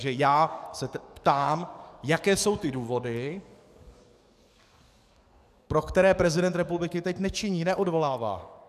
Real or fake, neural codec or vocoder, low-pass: fake; codec, 44.1 kHz, 7.8 kbps, DAC; 14.4 kHz